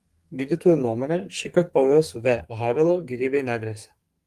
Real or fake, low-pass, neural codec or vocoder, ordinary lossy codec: fake; 14.4 kHz; codec, 32 kHz, 1.9 kbps, SNAC; Opus, 24 kbps